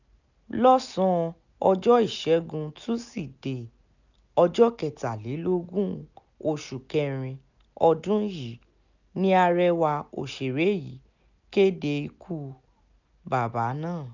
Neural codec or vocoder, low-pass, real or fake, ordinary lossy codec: none; 7.2 kHz; real; none